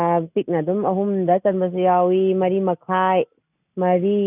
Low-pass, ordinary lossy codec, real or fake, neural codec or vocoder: 3.6 kHz; none; real; none